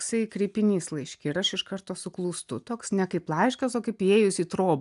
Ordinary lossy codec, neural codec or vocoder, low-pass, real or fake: AAC, 96 kbps; none; 10.8 kHz; real